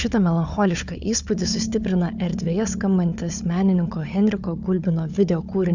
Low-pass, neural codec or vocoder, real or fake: 7.2 kHz; codec, 16 kHz, 4 kbps, FunCodec, trained on Chinese and English, 50 frames a second; fake